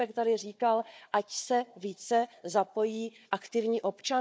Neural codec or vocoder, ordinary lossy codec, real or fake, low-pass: codec, 16 kHz, 4 kbps, FunCodec, trained on Chinese and English, 50 frames a second; none; fake; none